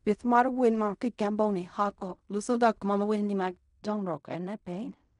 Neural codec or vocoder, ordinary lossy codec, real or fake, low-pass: codec, 16 kHz in and 24 kHz out, 0.4 kbps, LongCat-Audio-Codec, fine tuned four codebook decoder; none; fake; 10.8 kHz